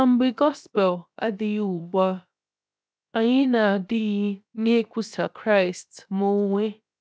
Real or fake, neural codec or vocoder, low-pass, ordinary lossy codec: fake; codec, 16 kHz, 0.7 kbps, FocalCodec; none; none